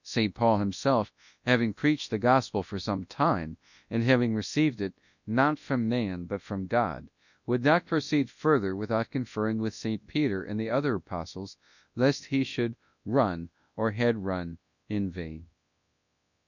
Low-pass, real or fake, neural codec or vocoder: 7.2 kHz; fake; codec, 24 kHz, 0.9 kbps, WavTokenizer, large speech release